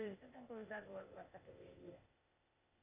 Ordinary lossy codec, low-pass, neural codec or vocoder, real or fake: AAC, 32 kbps; 3.6 kHz; codec, 16 kHz, 0.8 kbps, ZipCodec; fake